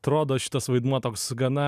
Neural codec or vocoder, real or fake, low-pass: none; real; 14.4 kHz